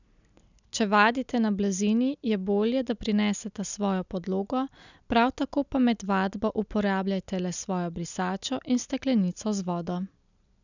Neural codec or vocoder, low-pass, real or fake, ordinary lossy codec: none; 7.2 kHz; real; none